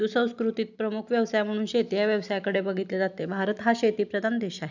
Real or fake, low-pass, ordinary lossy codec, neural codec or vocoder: real; 7.2 kHz; none; none